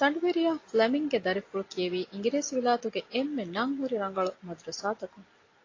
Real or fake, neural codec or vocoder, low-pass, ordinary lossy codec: real; none; 7.2 kHz; AAC, 32 kbps